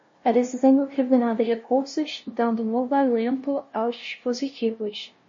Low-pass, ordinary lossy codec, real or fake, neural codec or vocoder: 7.2 kHz; MP3, 32 kbps; fake; codec, 16 kHz, 0.5 kbps, FunCodec, trained on LibriTTS, 25 frames a second